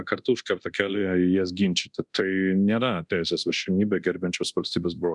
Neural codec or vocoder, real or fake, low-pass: codec, 24 kHz, 0.9 kbps, DualCodec; fake; 10.8 kHz